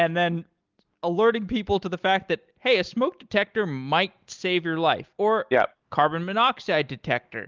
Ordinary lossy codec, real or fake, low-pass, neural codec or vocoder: Opus, 32 kbps; real; 7.2 kHz; none